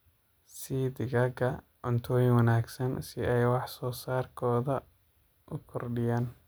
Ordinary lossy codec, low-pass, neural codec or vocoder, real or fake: none; none; none; real